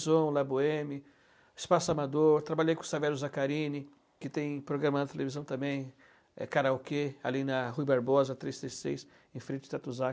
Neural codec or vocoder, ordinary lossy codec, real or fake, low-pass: none; none; real; none